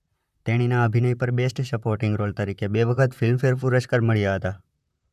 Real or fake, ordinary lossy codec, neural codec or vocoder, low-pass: fake; none; vocoder, 44.1 kHz, 128 mel bands, Pupu-Vocoder; 14.4 kHz